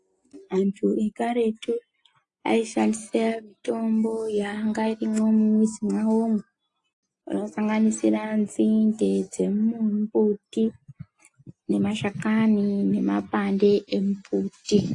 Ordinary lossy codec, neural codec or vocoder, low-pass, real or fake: AAC, 48 kbps; none; 10.8 kHz; real